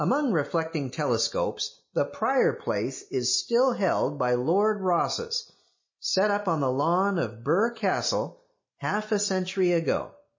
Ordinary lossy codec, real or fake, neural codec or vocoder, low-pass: MP3, 32 kbps; real; none; 7.2 kHz